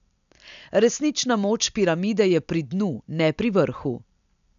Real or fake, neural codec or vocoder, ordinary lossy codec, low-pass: real; none; none; 7.2 kHz